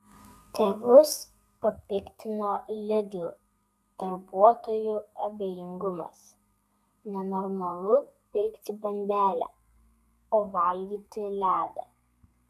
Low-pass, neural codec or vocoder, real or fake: 14.4 kHz; codec, 32 kHz, 1.9 kbps, SNAC; fake